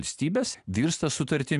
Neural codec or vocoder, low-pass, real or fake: none; 10.8 kHz; real